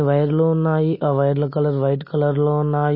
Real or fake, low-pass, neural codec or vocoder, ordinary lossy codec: real; 5.4 kHz; none; MP3, 24 kbps